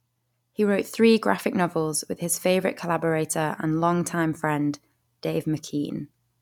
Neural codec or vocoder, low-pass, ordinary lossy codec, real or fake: vocoder, 44.1 kHz, 128 mel bands every 256 samples, BigVGAN v2; 19.8 kHz; none; fake